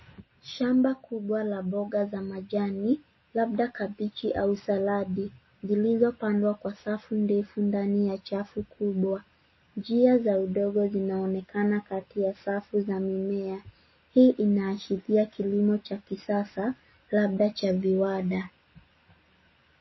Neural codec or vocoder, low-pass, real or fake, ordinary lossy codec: none; 7.2 kHz; real; MP3, 24 kbps